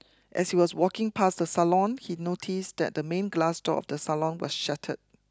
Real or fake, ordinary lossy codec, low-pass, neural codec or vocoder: real; none; none; none